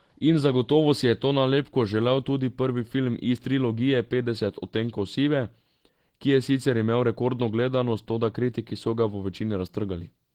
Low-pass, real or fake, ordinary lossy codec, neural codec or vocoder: 19.8 kHz; real; Opus, 16 kbps; none